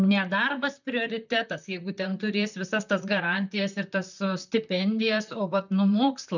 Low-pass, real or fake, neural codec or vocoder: 7.2 kHz; fake; vocoder, 44.1 kHz, 128 mel bands, Pupu-Vocoder